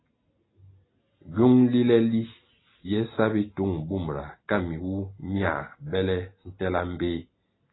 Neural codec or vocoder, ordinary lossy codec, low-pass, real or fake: none; AAC, 16 kbps; 7.2 kHz; real